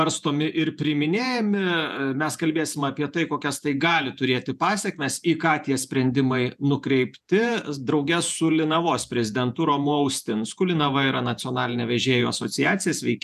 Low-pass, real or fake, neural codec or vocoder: 14.4 kHz; fake; vocoder, 48 kHz, 128 mel bands, Vocos